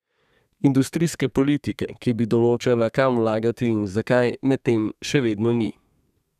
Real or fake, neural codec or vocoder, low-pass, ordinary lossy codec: fake; codec, 32 kHz, 1.9 kbps, SNAC; 14.4 kHz; none